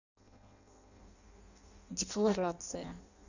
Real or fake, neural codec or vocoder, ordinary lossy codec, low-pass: fake; codec, 16 kHz in and 24 kHz out, 0.6 kbps, FireRedTTS-2 codec; none; 7.2 kHz